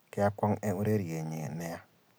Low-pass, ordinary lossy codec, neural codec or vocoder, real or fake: none; none; none; real